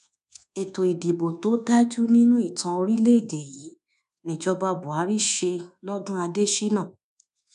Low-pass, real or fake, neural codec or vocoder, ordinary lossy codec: 10.8 kHz; fake; codec, 24 kHz, 1.2 kbps, DualCodec; none